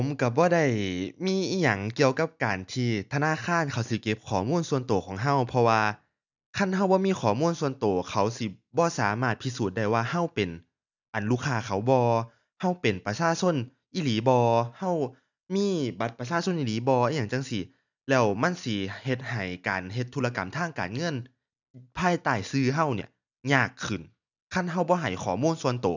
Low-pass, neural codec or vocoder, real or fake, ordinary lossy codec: 7.2 kHz; none; real; none